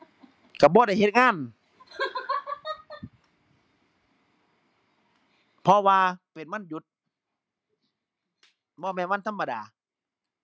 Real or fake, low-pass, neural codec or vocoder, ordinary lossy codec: real; none; none; none